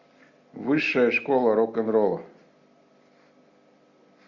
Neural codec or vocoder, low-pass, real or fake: none; 7.2 kHz; real